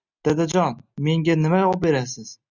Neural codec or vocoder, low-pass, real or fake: none; 7.2 kHz; real